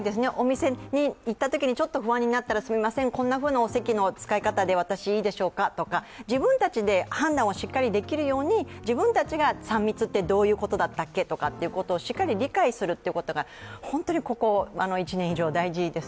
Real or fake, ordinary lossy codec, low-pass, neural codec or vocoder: real; none; none; none